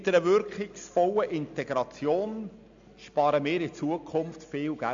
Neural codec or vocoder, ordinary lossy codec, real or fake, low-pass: none; AAC, 64 kbps; real; 7.2 kHz